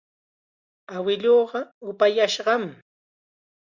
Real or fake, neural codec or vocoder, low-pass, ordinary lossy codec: real; none; 7.2 kHz; Opus, 64 kbps